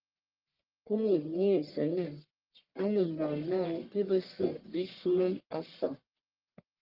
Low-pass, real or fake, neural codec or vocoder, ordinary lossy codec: 5.4 kHz; fake; codec, 44.1 kHz, 1.7 kbps, Pupu-Codec; Opus, 32 kbps